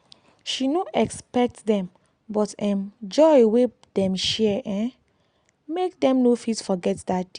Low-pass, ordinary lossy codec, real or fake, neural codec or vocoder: 9.9 kHz; Opus, 64 kbps; real; none